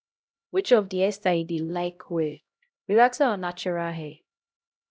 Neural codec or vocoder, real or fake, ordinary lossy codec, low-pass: codec, 16 kHz, 0.5 kbps, X-Codec, HuBERT features, trained on LibriSpeech; fake; none; none